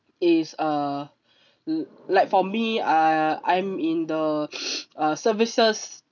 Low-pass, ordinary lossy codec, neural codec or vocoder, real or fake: 7.2 kHz; none; none; real